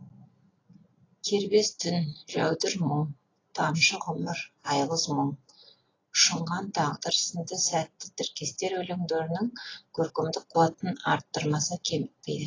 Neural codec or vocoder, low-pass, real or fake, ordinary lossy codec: none; 7.2 kHz; real; AAC, 32 kbps